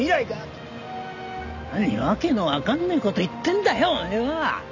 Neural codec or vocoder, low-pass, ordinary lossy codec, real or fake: none; 7.2 kHz; none; real